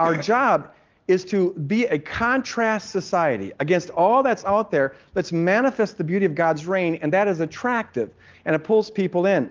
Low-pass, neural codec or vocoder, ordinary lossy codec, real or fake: 7.2 kHz; none; Opus, 32 kbps; real